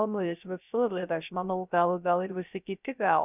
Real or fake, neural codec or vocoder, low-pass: fake; codec, 16 kHz, 0.3 kbps, FocalCodec; 3.6 kHz